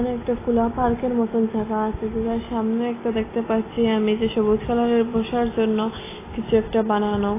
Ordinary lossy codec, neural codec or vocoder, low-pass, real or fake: AAC, 16 kbps; none; 3.6 kHz; real